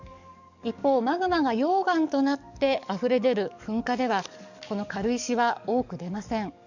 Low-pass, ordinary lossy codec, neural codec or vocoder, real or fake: 7.2 kHz; none; codec, 44.1 kHz, 7.8 kbps, DAC; fake